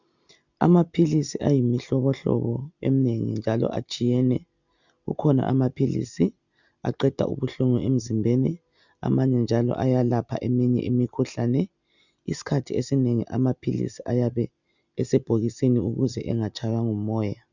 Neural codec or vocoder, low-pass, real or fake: none; 7.2 kHz; real